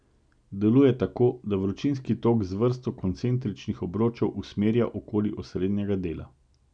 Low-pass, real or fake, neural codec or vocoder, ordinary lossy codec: 9.9 kHz; real; none; none